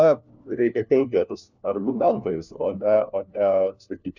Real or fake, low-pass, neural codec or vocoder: fake; 7.2 kHz; codec, 16 kHz, 1 kbps, FunCodec, trained on LibriTTS, 50 frames a second